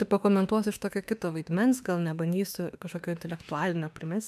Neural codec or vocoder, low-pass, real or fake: autoencoder, 48 kHz, 32 numbers a frame, DAC-VAE, trained on Japanese speech; 14.4 kHz; fake